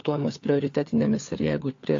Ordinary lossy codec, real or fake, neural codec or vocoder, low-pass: AAC, 48 kbps; fake; codec, 16 kHz, 4 kbps, FunCodec, trained on LibriTTS, 50 frames a second; 7.2 kHz